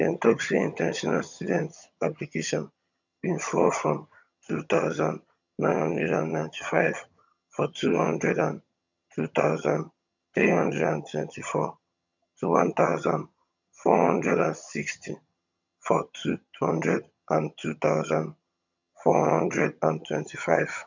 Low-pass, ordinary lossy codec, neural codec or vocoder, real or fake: 7.2 kHz; none; vocoder, 22.05 kHz, 80 mel bands, HiFi-GAN; fake